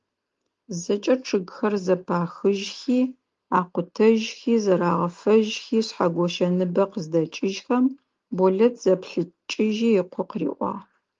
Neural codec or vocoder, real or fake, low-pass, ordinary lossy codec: none; real; 7.2 kHz; Opus, 32 kbps